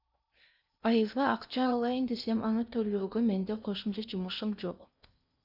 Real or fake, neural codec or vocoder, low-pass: fake; codec, 16 kHz in and 24 kHz out, 0.8 kbps, FocalCodec, streaming, 65536 codes; 5.4 kHz